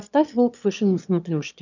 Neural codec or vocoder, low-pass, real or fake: autoencoder, 22.05 kHz, a latent of 192 numbers a frame, VITS, trained on one speaker; 7.2 kHz; fake